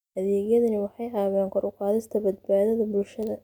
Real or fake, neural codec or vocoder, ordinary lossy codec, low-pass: real; none; none; 19.8 kHz